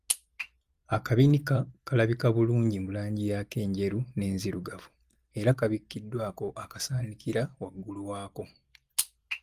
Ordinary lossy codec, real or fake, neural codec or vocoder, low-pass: Opus, 32 kbps; real; none; 14.4 kHz